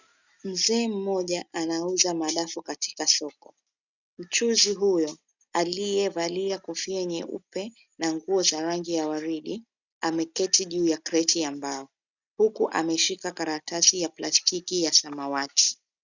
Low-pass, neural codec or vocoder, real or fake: 7.2 kHz; none; real